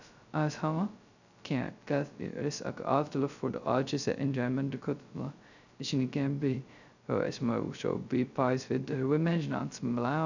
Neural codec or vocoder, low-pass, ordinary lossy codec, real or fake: codec, 16 kHz, 0.2 kbps, FocalCodec; 7.2 kHz; none; fake